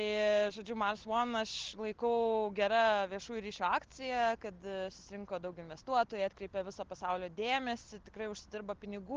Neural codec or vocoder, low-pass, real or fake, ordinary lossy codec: none; 7.2 kHz; real; Opus, 16 kbps